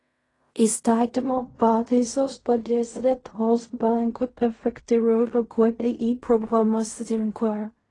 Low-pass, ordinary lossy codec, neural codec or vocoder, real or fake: 10.8 kHz; AAC, 32 kbps; codec, 16 kHz in and 24 kHz out, 0.4 kbps, LongCat-Audio-Codec, fine tuned four codebook decoder; fake